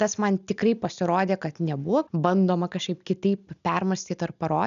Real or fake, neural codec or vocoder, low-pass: real; none; 7.2 kHz